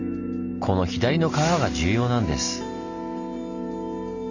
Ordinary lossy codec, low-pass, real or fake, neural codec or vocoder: none; 7.2 kHz; real; none